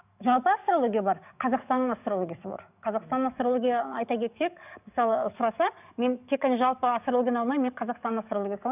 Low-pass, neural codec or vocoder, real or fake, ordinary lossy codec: 3.6 kHz; codec, 44.1 kHz, 7.8 kbps, DAC; fake; none